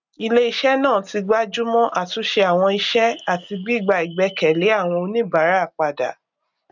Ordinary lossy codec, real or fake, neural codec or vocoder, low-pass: none; real; none; 7.2 kHz